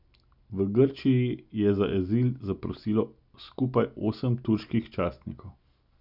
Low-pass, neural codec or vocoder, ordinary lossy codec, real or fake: 5.4 kHz; none; none; real